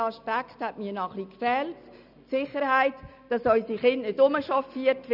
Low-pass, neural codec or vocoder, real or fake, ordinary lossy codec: 5.4 kHz; none; real; none